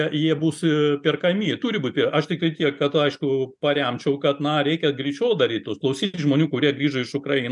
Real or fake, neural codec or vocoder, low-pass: real; none; 10.8 kHz